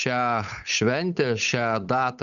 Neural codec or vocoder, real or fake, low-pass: codec, 16 kHz, 8 kbps, FunCodec, trained on Chinese and English, 25 frames a second; fake; 7.2 kHz